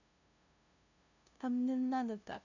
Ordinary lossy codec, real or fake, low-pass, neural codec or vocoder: none; fake; 7.2 kHz; codec, 16 kHz, 0.5 kbps, FunCodec, trained on LibriTTS, 25 frames a second